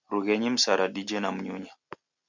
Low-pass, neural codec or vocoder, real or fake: 7.2 kHz; none; real